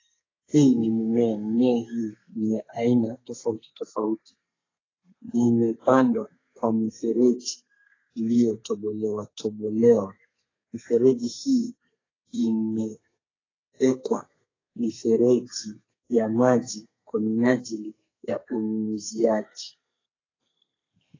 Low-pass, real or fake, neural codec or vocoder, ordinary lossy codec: 7.2 kHz; fake; codec, 32 kHz, 1.9 kbps, SNAC; AAC, 32 kbps